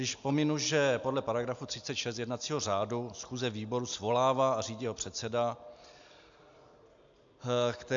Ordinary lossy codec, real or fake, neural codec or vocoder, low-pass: AAC, 64 kbps; real; none; 7.2 kHz